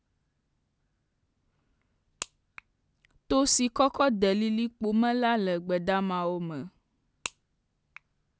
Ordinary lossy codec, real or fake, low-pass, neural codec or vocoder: none; real; none; none